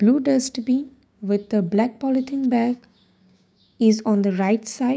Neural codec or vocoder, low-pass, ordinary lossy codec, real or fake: codec, 16 kHz, 6 kbps, DAC; none; none; fake